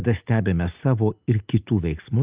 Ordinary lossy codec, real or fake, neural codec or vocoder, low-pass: Opus, 32 kbps; real; none; 3.6 kHz